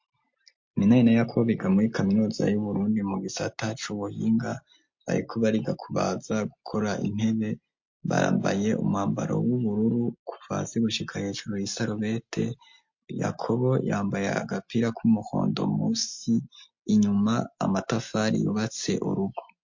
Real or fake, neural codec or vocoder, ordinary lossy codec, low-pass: real; none; MP3, 48 kbps; 7.2 kHz